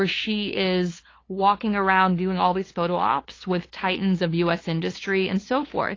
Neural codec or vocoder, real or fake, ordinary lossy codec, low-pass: codec, 16 kHz, 2 kbps, FunCodec, trained on LibriTTS, 25 frames a second; fake; AAC, 32 kbps; 7.2 kHz